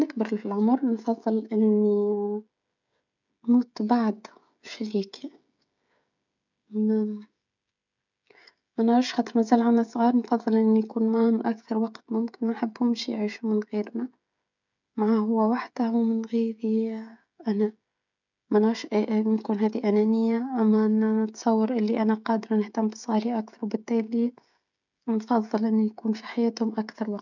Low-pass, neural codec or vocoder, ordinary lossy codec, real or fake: 7.2 kHz; codec, 16 kHz, 16 kbps, FreqCodec, smaller model; none; fake